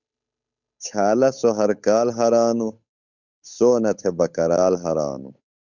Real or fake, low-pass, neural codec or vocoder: fake; 7.2 kHz; codec, 16 kHz, 8 kbps, FunCodec, trained on Chinese and English, 25 frames a second